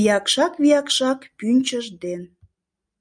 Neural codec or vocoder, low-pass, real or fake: none; 9.9 kHz; real